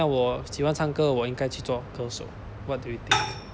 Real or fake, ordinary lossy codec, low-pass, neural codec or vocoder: real; none; none; none